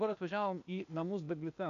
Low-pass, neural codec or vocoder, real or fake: 7.2 kHz; codec, 16 kHz, 0.8 kbps, ZipCodec; fake